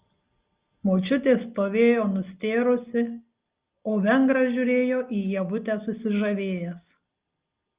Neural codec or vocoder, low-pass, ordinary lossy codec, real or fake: none; 3.6 kHz; Opus, 32 kbps; real